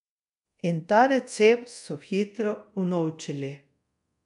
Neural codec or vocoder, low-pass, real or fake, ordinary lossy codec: codec, 24 kHz, 0.5 kbps, DualCodec; 10.8 kHz; fake; none